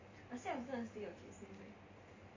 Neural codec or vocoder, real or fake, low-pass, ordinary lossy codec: none; real; 7.2 kHz; MP3, 64 kbps